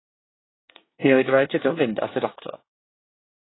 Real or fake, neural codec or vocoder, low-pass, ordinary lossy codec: fake; codec, 24 kHz, 1 kbps, SNAC; 7.2 kHz; AAC, 16 kbps